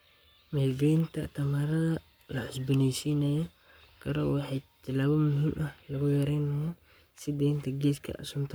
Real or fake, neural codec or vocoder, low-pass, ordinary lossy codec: fake; codec, 44.1 kHz, 7.8 kbps, Pupu-Codec; none; none